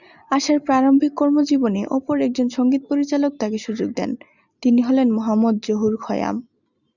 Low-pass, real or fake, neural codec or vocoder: 7.2 kHz; real; none